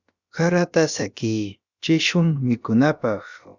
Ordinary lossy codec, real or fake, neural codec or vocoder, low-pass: Opus, 64 kbps; fake; codec, 16 kHz, about 1 kbps, DyCAST, with the encoder's durations; 7.2 kHz